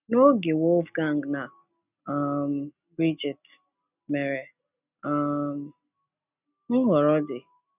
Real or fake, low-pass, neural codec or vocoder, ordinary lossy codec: real; 3.6 kHz; none; none